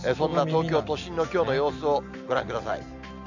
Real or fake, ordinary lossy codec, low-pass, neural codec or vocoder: real; none; 7.2 kHz; none